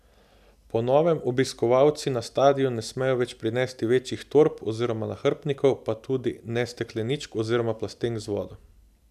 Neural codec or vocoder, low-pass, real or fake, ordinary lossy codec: none; 14.4 kHz; real; none